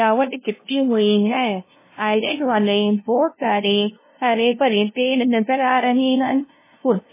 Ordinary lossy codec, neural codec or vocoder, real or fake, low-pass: MP3, 16 kbps; codec, 16 kHz, 0.5 kbps, FunCodec, trained on LibriTTS, 25 frames a second; fake; 3.6 kHz